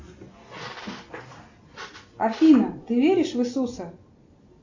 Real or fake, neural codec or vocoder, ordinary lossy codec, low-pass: real; none; AAC, 48 kbps; 7.2 kHz